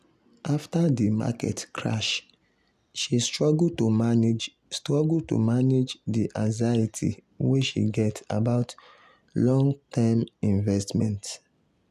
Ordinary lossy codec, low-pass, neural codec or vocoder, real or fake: none; 14.4 kHz; none; real